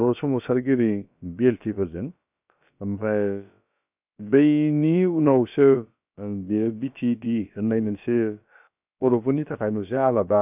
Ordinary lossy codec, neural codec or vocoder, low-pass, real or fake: none; codec, 16 kHz, about 1 kbps, DyCAST, with the encoder's durations; 3.6 kHz; fake